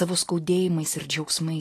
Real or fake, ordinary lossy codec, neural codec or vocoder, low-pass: real; AAC, 48 kbps; none; 14.4 kHz